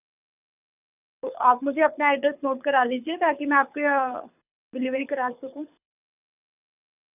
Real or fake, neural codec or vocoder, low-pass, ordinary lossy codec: fake; codec, 24 kHz, 6 kbps, HILCodec; 3.6 kHz; none